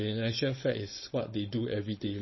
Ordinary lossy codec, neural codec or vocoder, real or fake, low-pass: MP3, 24 kbps; codec, 16 kHz, 4.8 kbps, FACodec; fake; 7.2 kHz